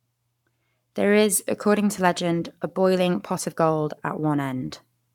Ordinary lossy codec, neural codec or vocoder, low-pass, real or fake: none; codec, 44.1 kHz, 7.8 kbps, Pupu-Codec; 19.8 kHz; fake